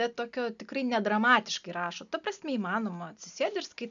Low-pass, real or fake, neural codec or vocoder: 7.2 kHz; real; none